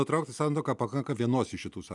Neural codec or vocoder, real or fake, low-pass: none; real; 10.8 kHz